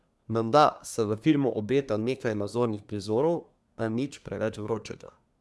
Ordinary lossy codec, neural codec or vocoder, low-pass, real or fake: none; codec, 24 kHz, 1 kbps, SNAC; none; fake